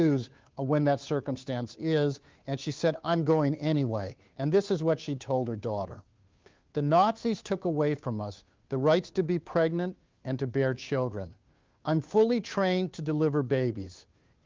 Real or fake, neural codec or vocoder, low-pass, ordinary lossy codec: fake; codec, 16 kHz, 2 kbps, FunCodec, trained on Chinese and English, 25 frames a second; 7.2 kHz; Opus, 32 kbps